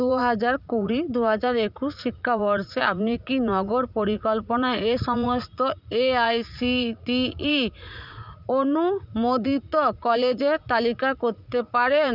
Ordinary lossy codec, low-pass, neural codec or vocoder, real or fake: none; 5.4 kHz; vocoder, 44.1 kHz, 80 mel bands, Vocos; fake